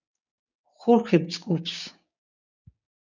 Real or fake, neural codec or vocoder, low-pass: fake; codec, 16 kHz, 6 kbps, DAC; 7.2 kHz